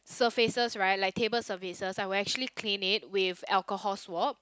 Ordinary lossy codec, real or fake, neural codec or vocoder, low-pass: none; real; none; none